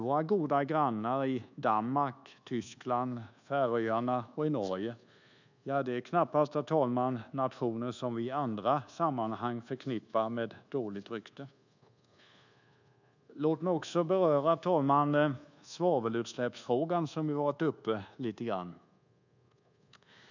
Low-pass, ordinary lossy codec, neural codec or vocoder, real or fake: 7.2 kHz; none; codec, 24 kHz, 1.2 kbps, DualCodec; fake